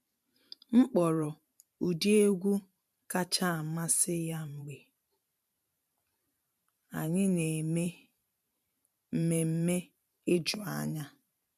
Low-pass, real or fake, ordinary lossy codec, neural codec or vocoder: 14.4 kHz; fake; none; vocoder, 44.1 kHz, 128 mel bands every 512 samples, BigVGAN v2